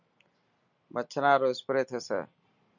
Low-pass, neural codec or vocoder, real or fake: 7.2 kHz; none; real